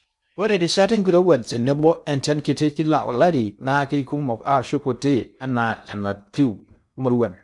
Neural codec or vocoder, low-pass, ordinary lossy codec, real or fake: codec, 16 kHz in and 24 kHz out, 0.6 kbps, FocalCodec, streaming, 4096 codes; 10.8 kHz; none; fake